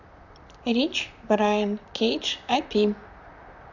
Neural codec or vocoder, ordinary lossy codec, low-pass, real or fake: vocoder, 44.1 kHz, 128 mel bands, Pupu-Vocoder; none; 7.2 kHz; fake